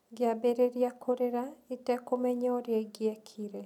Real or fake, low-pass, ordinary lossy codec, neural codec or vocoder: real; 19.8 kHz; none; none